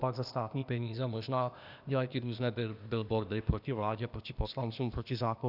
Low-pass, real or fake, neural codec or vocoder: 5.4 kHz; fake; codec, 16 kHz, 0.8 kbps, ZipCodec